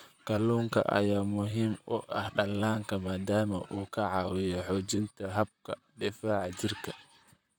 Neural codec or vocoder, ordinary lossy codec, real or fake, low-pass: vocoder, 44.1 kHz, 128 mel bands, Pupu-Vocoder; none; fake; none